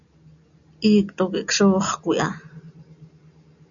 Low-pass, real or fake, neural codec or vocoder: 7.2 kHz; real; none